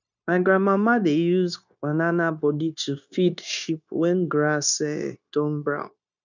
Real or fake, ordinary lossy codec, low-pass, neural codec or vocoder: fake; none; 7.2 kHz; codec, 16 kHz, 0.9 kbps, LongCat-Audio-Codec